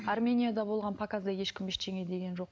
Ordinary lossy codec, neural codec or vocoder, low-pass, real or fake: none; none; none; real